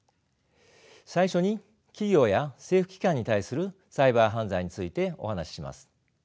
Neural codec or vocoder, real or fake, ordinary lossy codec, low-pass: none; real; none; none